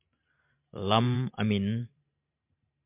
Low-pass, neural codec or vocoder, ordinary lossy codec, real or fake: 3.6 kHz; vocoder, 44.1 kHz, 128 mel bands every 512 samples, BigVGAN v2; MP3, 32 kbps; fake